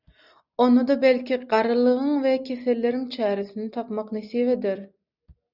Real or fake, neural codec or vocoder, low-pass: real; none; 5.4 kHz